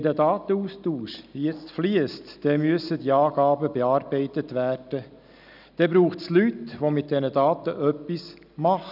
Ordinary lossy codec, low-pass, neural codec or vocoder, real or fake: none; 5.4 kHz; none; real